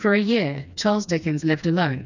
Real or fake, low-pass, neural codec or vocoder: fake; 7.2 kHz; codec, 16 kHz, 2 kbps, FreqCodec, smaller model